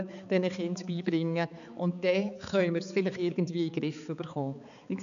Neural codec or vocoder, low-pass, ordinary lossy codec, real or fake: codec, 16 kHz, 4 kbps, X-Codec, HuBERT features, trained on balanced general audio; 7.2 kHz; none; fake